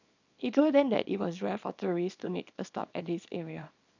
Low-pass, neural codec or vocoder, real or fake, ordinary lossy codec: 7.2 kHz; codec, 24 kHz, 0.9 kbps, WavTokenizer, small release; fake; none